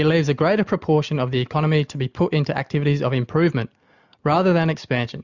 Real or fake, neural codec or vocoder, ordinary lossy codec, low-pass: real; none; Opus, 64 kbps; 7.2 kHz